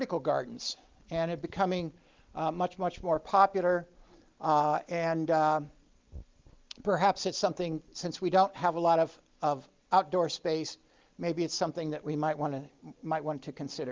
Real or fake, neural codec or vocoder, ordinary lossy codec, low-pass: real; none; Opus, 32 kbps; 7.2 kHz